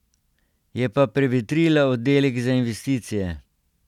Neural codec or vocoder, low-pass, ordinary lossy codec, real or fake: none; 19.8 kHz; none; real